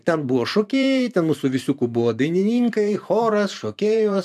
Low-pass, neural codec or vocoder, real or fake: 14.4 kHz; vocoder, 44.1 kHz, 128 mel bands every 512 samples, BigVGAN v2; fake